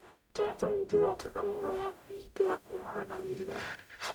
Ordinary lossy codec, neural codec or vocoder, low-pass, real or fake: none; codec, 44.1 kHz, 0.9 kbps, DAC; none; fake